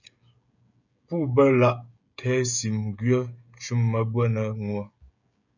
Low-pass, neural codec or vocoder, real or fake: 7.2 kHz; codec, 16 kHz, 16 kbps, FreqCodec, smaller model; fake